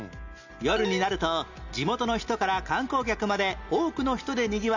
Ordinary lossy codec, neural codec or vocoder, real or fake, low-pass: none; none; real; 7.2 kHz